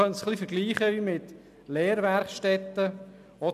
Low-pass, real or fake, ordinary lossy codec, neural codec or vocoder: 14.4 kHz; real; none; none